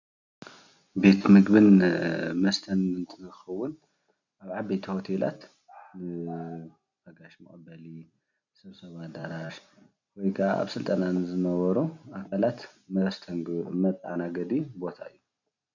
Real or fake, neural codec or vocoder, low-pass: real; none; 7.2 kHz